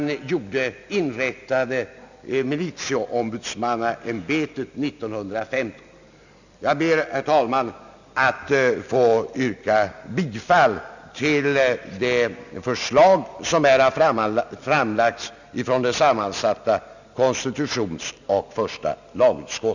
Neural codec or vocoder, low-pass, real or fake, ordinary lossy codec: none; 7.2 kHz; real; none